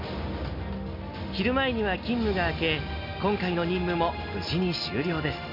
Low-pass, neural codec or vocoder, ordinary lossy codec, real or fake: 5.4 kHz; none; MP3, 32 kbps; real